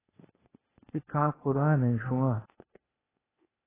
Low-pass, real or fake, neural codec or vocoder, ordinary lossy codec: 3.6 kHz; fake; codec, 16 kHz, 0.8 kbps, ZipCodec; AAC, 16 kbps